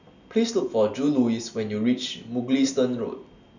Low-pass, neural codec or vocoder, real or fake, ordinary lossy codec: 7.2 kHz; none; real; none